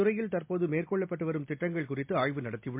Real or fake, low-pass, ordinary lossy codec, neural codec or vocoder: real; 3.6 kHz; MP3, 32 kbps; none